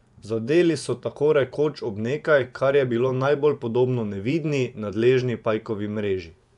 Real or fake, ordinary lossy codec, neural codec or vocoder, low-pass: fake; none; vocoder, 24 kHz, 100 mel bands, Vocos; 10.8 kHz